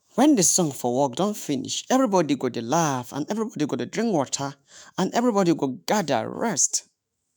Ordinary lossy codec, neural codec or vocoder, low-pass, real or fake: none; autoencoder, 48 kHz, 128 numbers a frame, DAC-VAE, trained on Japanese speech; none; fake